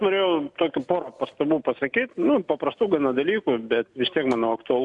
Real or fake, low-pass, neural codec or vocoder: real; 10.8 kHz; none